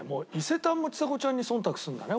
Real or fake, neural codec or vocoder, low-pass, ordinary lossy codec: real; none; none; none